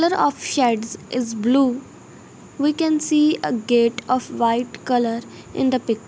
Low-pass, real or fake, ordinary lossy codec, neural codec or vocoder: none; real; none; none